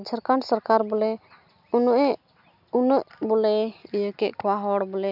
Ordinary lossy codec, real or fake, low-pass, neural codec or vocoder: none; real; 5.4 kHz; none